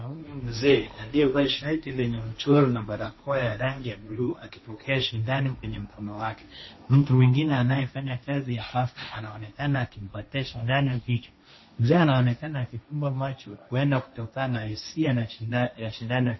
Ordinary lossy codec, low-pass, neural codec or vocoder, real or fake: MP3, 24 kbps; 7.2 kHz; codec, 16 kHz, 1.1 kbps, Voila-Tokenizer; fake